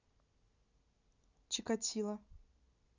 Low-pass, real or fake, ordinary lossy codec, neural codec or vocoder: 7.2 kHz; real; none; none